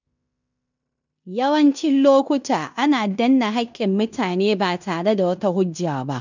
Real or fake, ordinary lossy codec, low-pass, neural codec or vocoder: fake; none; 7.2 kHz; codec, 16 kHz in and 24 kHz out, 0.9 kbps, LongCat-Audio-Codec, fine tuned four codebook decoder